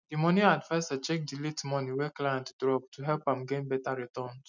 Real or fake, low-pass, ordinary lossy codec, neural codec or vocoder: real; 7.2 kHz; none; none